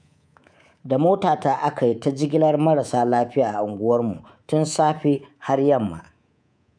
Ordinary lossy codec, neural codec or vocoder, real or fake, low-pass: none; codec, 24 kHz, 3.1 kbps, DualCodec; fake; 9.9 kHz